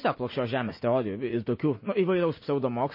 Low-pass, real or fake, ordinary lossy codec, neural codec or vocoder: 5.4 kHz; real; MP3, 24 kbps; none